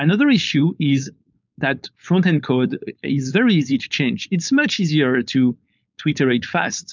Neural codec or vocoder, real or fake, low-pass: codec, 16 kHz, 4.8 kbps, FACodec; fake; 7.2 kHz